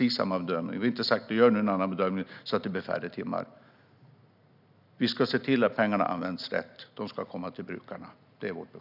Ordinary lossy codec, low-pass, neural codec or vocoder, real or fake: none; 5.4 kHz; none; real